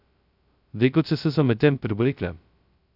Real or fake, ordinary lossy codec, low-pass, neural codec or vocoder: fake; none; 5.4 kHz; codec, 16 kHz, 0.2 kbps, FocalCodec